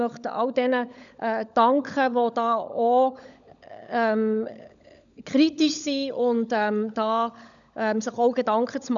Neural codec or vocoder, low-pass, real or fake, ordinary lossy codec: codec, 16 kHz, 16 kbps, FunCodec, trained on LibriTTS, 50 frames a second; 7.2 kHz; fake; none